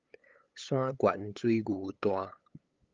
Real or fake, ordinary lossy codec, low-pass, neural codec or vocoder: fake; Opus, 32 kbps; 7.2 kHz; codec, 16 kHz, 8 kbps, FunCodec, trained on LibriTTS, 25 frames a second